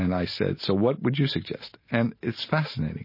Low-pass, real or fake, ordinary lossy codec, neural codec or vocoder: 5.4 kHz; real; MP3, 24 kbps; none